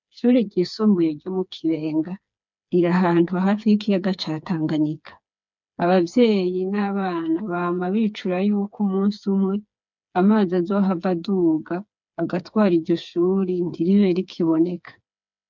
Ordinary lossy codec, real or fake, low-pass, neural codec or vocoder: MP3, 64 kbps; fake; 7.2 kHz; codec, 16 kHz, 4 kbps, FreqCodec, smaller model